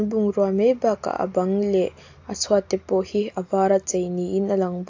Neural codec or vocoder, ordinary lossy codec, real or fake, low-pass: none; AAC, 48 kbps; real; 7.2 kHz